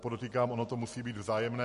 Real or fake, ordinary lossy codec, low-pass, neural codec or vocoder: real; MP3, 48 kbps; 14.4 kHz; none